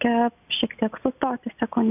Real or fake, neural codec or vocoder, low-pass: real; none; 3.6 kHz